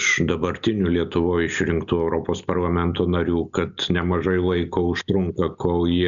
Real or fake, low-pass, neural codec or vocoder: real; 7.2 kHz; none